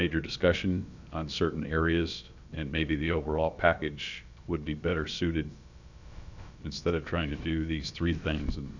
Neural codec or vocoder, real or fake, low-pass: codec, 16 kHz, about 1 kbps, DyCAST, with the encoder's durations; fake; 7.2 kHz